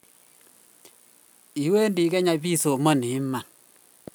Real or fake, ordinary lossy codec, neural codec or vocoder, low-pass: real; none; none; none